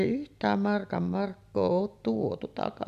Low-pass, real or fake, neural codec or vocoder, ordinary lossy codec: 14.4 kHz; real; none; none